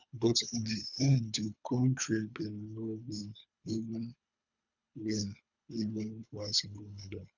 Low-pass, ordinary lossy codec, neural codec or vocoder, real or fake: 7.2 kHz; none; codec, 24 kHz, 3 kbps, HILCodec; fake